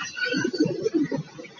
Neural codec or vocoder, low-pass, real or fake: none; 7.2 kHz; real